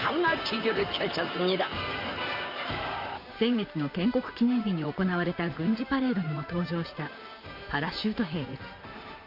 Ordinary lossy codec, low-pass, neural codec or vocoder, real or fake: Opus, 64 kbps; 5.4 kHz; vocoder, 44.1 kHz, 128 mel bands, Pupu-Vocoder; fake